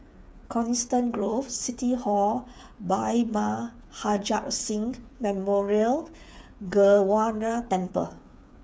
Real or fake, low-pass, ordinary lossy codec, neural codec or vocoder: fake; none; none; codec, 16 kHz, 8 kbps, FreqCodec, smaller model